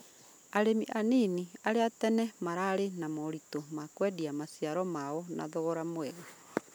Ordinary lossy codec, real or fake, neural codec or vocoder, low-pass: none; real; none; none